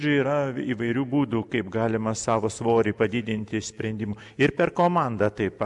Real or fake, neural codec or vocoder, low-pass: real; none; 10.8 kHz